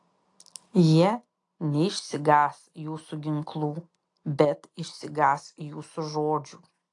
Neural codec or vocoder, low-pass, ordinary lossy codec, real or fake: none; 10.8 kHz; AAC, 48 kbps; real